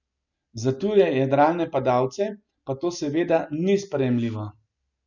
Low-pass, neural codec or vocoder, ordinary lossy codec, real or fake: 7.2 kHz; none; none; real